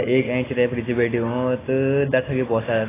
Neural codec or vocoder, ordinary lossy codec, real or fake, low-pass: none; AAC, 16 kbps; real; 3.6 kHz